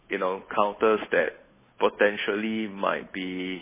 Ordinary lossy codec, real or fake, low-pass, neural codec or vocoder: MP3, 16 kbps; fake; 3.6 kHz; codec, 16 kHz, 8 kbps, FunCodec, trained on Chinese and English, 25 frames a second